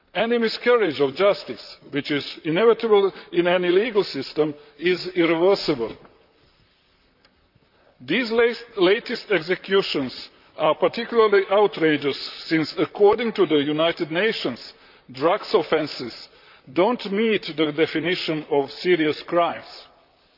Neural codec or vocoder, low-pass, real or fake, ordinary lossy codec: vocoder, 44.1 kHz, 128 mel bands, Pupu-Vocoder; 5.4 kHz; fake; none